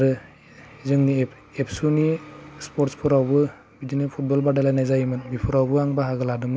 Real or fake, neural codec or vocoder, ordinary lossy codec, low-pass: real; none; none; none